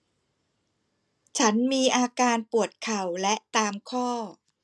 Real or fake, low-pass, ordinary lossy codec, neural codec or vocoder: real; none; none; none